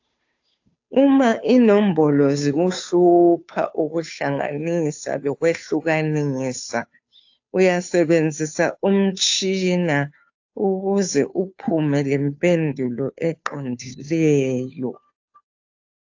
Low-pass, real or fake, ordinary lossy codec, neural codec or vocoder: 7.2 kHz; fake; AAC, 48 kbps; codec, 16 kHz, 2 kbps, FunCodec, trained on Chinese and English, 25 frames a second